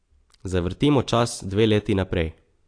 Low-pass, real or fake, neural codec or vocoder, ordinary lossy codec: 9.9 kHz; real; none; AAC, 48 kbps